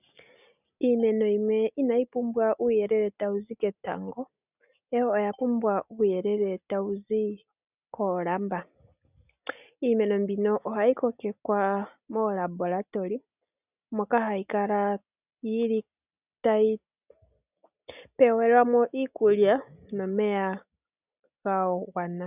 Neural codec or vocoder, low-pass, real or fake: none; 3.6 kHz; real